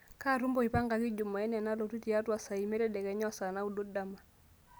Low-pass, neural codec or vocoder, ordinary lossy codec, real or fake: none; none; none; real